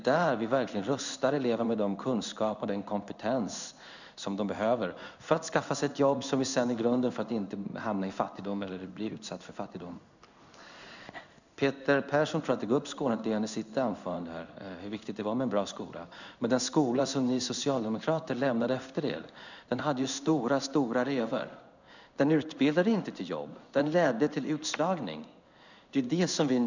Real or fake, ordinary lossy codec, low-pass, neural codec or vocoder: fake; none; 7.2 kHz; codec, 16 kHz in and 24 kHz out, 1 kbps, XY-Tokenizer